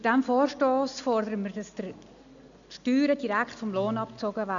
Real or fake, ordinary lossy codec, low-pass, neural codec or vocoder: real; none; 7.2 kHz; none